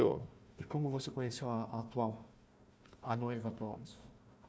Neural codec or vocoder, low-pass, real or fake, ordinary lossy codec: codec, 16 kHz, 1 kbps, FunCodec, trained on Chinese and English, 50 frames a second; none; fake; none